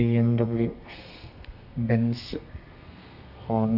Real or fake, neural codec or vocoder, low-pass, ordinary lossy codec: fake; codec, 32 kHz, 1.9 kbps, SNAC; 5.4 kHz; Opus, 64 kbps